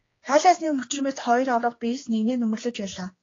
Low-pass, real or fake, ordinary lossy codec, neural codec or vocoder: 7.2 kHz; fake; AAC, 32 kbps; codec, 16 kHz, 2 kbps, X-Codec, HuBERT features, trained on general audio